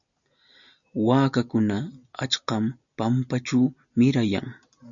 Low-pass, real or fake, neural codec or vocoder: 7.2 kHz; real; none